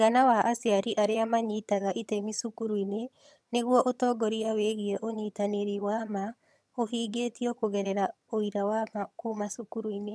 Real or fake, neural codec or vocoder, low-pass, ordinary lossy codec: fake; vocoder, 22.05 kHz, 80 mel bands, HiFi-GAN; none; none